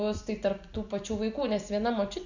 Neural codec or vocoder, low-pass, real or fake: none; 7.2 kHz; real